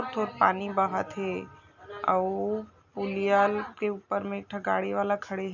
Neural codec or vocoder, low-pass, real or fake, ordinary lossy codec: none; 7.2 kHz; real; none